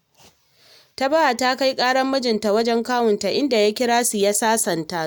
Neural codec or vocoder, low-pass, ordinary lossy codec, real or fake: none; none; none; real